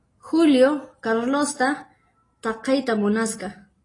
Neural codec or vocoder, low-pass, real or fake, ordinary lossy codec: none; 10.8 kHz; real; AAC, 32 kbps